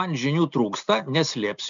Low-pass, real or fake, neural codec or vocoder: 7.2 kHz; real; none